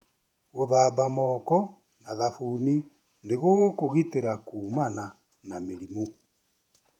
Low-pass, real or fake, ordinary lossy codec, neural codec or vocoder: 19.8 kHz; fake; none; vocoder, 48 kHz, 128 mel bands, Vocos